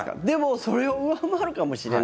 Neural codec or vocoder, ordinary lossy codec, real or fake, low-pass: none; none; real; none